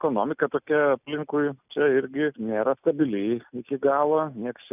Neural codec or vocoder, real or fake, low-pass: none; real; 3.6 kHz